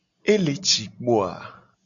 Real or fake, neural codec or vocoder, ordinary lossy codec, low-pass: real; none; MP3, 96 kbps; 7.2 kHz